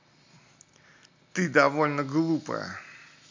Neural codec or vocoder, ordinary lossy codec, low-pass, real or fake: none; MP3, 64 kbps; 7.2 kHz; real